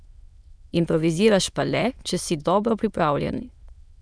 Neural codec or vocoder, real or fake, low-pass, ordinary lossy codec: autoencoder, 22.05 kHz, a latent of 192 numbers a frame, VITS, trained on many speakers; fake; none; none